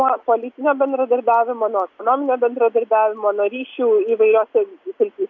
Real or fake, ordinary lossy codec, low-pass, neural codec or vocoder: real; MP3, 64 kbps; 7.2 kHz; none